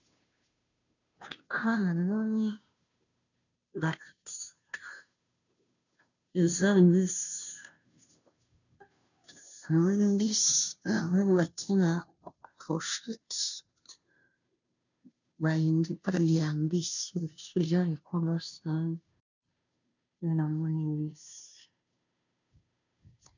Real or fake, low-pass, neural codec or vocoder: fake; 7.2 kHz; codec, 16 kHz, 0.5 kbps, FunCodec, trained on Chinese and English, 25 frames a second